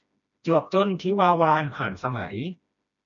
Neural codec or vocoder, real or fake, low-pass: codec, 16 kHz, 1 kbps, FreqCodec, smaller model; fake; 7.2 kHz